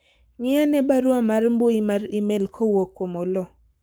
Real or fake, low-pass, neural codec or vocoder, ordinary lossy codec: fake; none; codec, 44.1 kHz, 7.8 kbps, Pupu-Codec; none